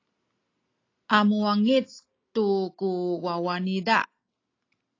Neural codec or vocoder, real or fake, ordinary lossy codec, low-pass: none; real; AAC, 32 kbps; 7.2 kHz